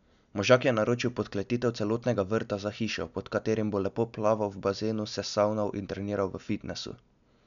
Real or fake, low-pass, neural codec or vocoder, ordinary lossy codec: real; 7.2 kHz; none; none